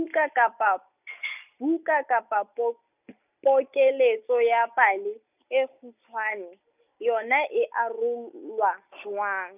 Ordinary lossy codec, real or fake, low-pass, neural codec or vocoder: none; real; 3.6 kHz; none